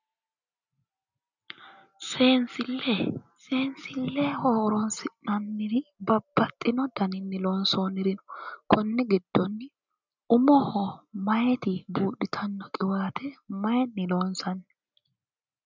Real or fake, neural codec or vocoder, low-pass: real; none; 7.2 kHz